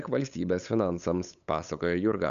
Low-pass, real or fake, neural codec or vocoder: 7.2 kHz; fake; codec, 16 kHz, 8 kbps, FunCodec, trained on LibriTTS, 25 frames a second